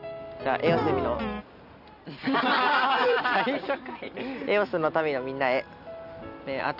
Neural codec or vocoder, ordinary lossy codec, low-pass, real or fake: none; none; 5.4 kHz; real